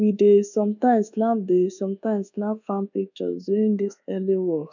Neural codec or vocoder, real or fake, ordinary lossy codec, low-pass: codec, 24 kHz, 1.2 kbps, DualCodec; fake; none; 7.2 kHz